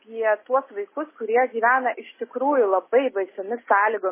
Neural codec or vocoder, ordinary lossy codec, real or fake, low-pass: none; MP3, 16 kbps; real; 3.6 kHz